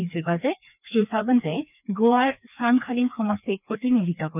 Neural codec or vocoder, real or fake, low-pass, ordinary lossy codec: codec, 16 kHz, 2 kbps, FreqCodec, larger model; fake; 3.6 kHz; none